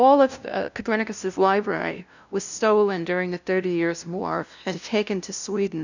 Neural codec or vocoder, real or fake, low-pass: codec, 16 kHz, 0.5 kbps, FunCodec, trained on LibriTTS, 25 frames a second; fake; 7.2 kHz